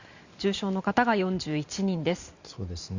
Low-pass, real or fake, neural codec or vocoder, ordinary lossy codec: 7.2 kHz; fake; vocoder, 44.1 kHz, 128 mel bands every 512 samples, BigVGAN v2; Opus, 64 kbps